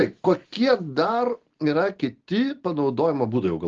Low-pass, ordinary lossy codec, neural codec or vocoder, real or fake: 7.2 kHz; Opus, 16 kbps; none; real